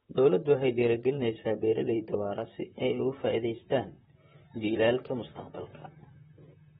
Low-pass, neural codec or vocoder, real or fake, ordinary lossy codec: 19.8 kHz; vocoder, 44.1 kHz, 128 mel bands, Pupu-Vocoder; fake; AAC, 16 kbps